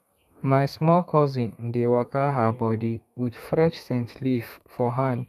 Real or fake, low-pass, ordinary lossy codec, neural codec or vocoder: fake; 14.4 kHz; none; codec, 32 kHz, 1.9 kbps, SNAC